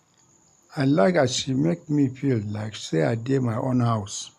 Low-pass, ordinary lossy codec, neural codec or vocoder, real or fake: 14.4 kHz; none; none; real